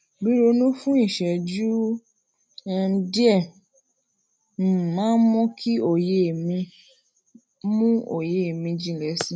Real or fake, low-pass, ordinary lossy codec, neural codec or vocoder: real; none; none; none